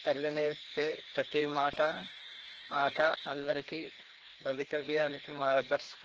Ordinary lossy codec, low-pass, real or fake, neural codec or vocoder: Opus, 16 kbps; 7.2 kHz; fake; codec, 16 kHz, 1 kbps, FreqCodec, larger model